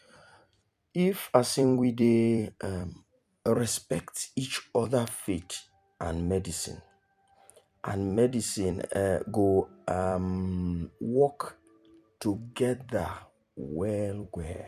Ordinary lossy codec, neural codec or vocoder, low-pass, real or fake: none; vocoder, 44.1 kHz, 128 mel bands every 256 samples, BigVGAN v2; 14.4 kHz; fake